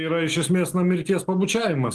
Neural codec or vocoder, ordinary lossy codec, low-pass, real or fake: none; Opus, 16 kbps; 10.8 kHz; real